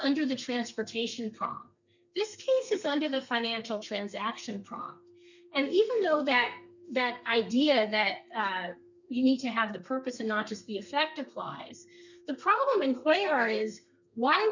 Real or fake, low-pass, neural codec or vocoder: fake; 7.2 kHz; codec, 32 kHz, 1.9 kbps, SNAC